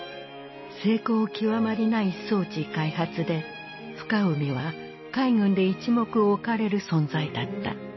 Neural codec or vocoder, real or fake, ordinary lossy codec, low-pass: none; real; MP3, 24 kbps; 7.2 kHz